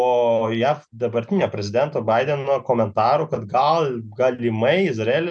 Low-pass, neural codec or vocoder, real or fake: 9.9 kHz; none; real